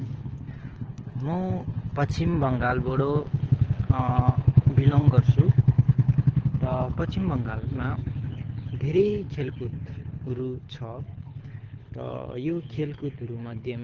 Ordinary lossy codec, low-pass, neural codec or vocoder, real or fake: Opus, 16 kbps; 7.2 kHz; codec, 24 kHz, 6 kbps, HILCodec; fake